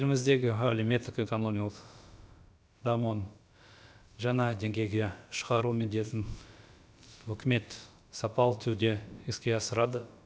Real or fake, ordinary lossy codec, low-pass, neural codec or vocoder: fake; none; none; codec, 16 kHz, about 1 kbps, DyCAST, with the encoder's durations